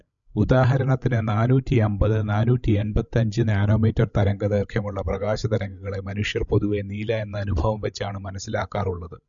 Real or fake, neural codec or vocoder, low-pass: fake; codec, 16 kHz, 16 kbps, FreqCodec, larger model; 7.2 kHz